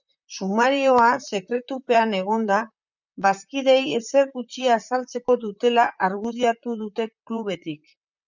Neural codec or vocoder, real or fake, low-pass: vocoder, 44.1 kHz, 128 mel bands, Pupu-Vocoder; fake; 7.2 kHz